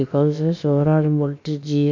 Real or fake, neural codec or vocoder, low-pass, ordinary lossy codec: fake; codec, 16 kHz, about 1 kbps, DyCAST, with the encoder's durations; 7.2 kHz; MP3, 64 kbps